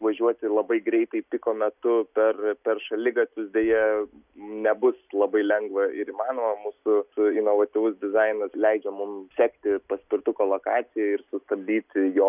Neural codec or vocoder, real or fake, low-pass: none; real; 3.6 kHz